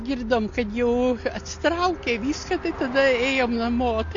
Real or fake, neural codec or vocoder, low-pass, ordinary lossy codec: real; none; 7.2 kHz; AAC, 48 kbps